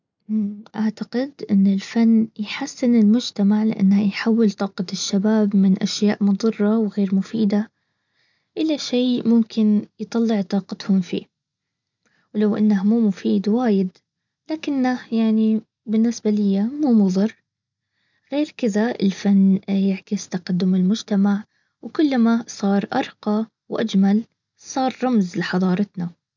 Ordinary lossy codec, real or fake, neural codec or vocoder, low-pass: none; real; none; 7.2 kHz